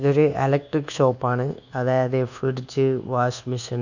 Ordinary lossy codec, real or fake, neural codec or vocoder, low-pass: none; fake; codec, 24 kHz, 1.2 kbps, DualCodec; 7.2 kHz